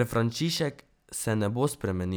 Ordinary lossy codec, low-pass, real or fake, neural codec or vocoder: none; none; real; none